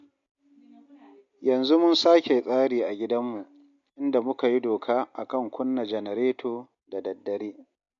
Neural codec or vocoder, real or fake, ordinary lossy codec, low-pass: none; real; MP3, 48 kbps; 7.2 kHz